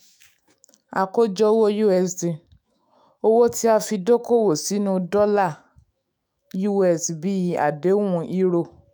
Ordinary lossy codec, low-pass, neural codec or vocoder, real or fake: none; none; autoencoder, 48 kHz, 128 numbers a frame, DAC-VAE, trained on Japanese speech; fake